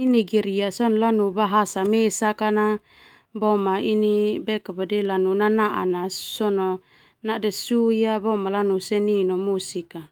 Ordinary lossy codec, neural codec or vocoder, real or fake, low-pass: Opus, 32 kbps; autoencoder, 48 kHz, 128 numbers a frame, DAC-VAE, trained on Japanese speech; fake; 19.8 kHz